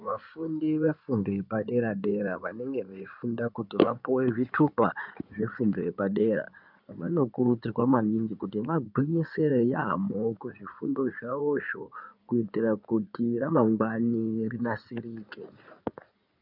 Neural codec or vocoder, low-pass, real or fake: codec, 16 kHz in and 24 kHz out, 2.2 kbps, FireRedTTS-2 codec; 5.4 kHz; fake